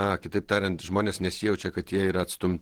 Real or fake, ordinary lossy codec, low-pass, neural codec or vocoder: fake; Opus, 16 kbps; 19.8 kHz; vocoder, 48 kHz, 128 mel bands, Vocos